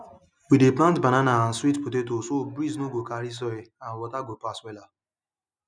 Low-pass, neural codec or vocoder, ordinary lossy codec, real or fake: 9.9 kHz; none; none; real